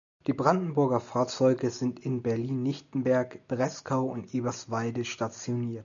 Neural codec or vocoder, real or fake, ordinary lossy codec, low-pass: none; real; MP3, 96 kbps; 7.2 kHz